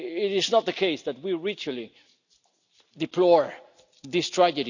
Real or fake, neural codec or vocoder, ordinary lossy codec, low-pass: real; none; none; 7.2 kHz